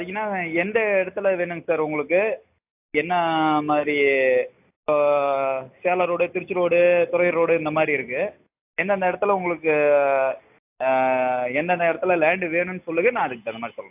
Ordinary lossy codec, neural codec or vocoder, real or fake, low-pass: AAC, 32 kbps; none; real; 3.6 kHz